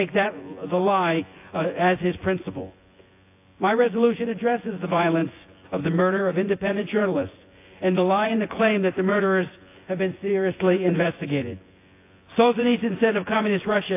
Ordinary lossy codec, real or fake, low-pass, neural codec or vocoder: AAC, 24 kbps; fake; 3.6 kHz; vocoder, 24 kHz, 100 mel bands, Vocos